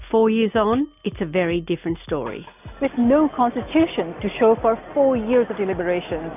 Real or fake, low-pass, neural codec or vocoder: real; 3.6 kHz; none